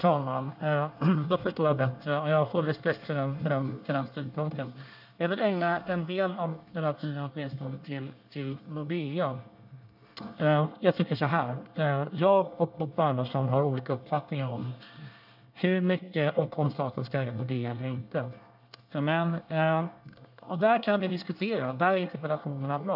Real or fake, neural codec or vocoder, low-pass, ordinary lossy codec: fake; codec, 24 kHz, 1 kbps, SNAC; 5.4 kHz; none